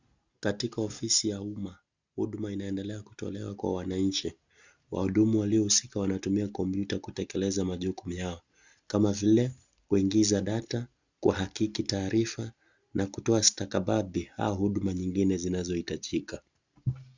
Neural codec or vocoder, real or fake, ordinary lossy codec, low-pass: none; real; Opus, 64 kbps; 7.2 kHz